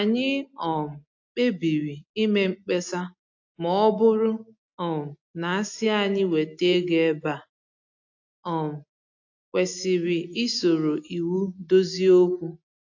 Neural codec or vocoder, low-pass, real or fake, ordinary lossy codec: none; 7.2 kHz; real; AAC, 48 kbps